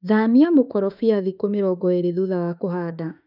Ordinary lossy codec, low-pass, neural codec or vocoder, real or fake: none; 5.4 kHz; autoencoder, 48 kHz, 32 numbers a frame, DAC-VAE, trained on Japanese speech; fake